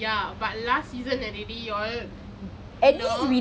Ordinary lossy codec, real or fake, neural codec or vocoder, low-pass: none; real; none; none